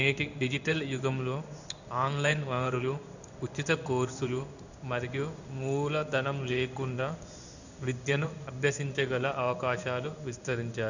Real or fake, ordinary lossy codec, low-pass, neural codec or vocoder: fake; none; 7.2 kHz; codec, 16 kHz in and 24 kHz out, 1 kbps, XY-Tokenizer